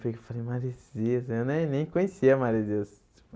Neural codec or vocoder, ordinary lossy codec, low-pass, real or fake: none; none; none; real